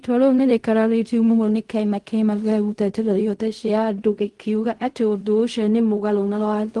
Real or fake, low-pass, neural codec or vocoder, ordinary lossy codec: fake; 10.8 kHz; codec, 16 kHz in and 24 kHz out, 0.4 kbps, LongCat-Audio-Codec, fine tuned four codebook decoder; Opus, 24 kbps